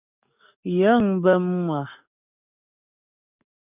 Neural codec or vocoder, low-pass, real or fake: codec, 44.1 kHz, 7.8 kbps, DAC; 3.6 kHz; fake